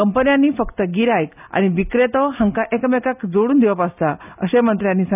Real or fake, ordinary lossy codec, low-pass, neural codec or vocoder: real; none; 3.6 kHz; none